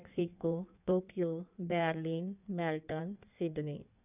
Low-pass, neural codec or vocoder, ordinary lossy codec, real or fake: 3.6 kHz; codec, 16 kHz in and 24 kHz out, 1.1 kbps, FireRedTTS-2 codec; none; fake